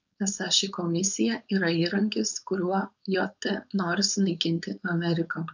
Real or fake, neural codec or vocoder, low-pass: fake; codec, 16 kHz, 4.8 kbps, FACodec; 7.2 kHz